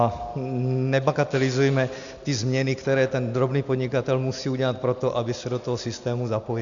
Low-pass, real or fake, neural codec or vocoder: 7.2 kHz; real; none